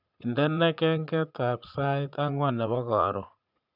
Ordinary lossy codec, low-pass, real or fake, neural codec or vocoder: none; 5.4 kHz; fake; vocoder, 44.1 kHz, 80 mel bands, Vocos